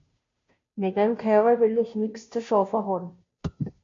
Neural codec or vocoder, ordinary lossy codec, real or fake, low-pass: codec, 16 kHz, 0.5 kbps, FunCodec, trained on Chinese and English, 25 frames a second; MP3, 48 kbps; fake; 7.2 kHz